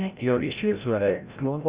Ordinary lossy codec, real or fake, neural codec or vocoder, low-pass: Opus, 64 kbps; fake; codec, 16 kHz, 0.5 kbps, FreqCodec, larger model; 3.6 kHz